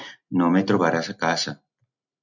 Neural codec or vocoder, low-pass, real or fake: none; 7.2 kHz; real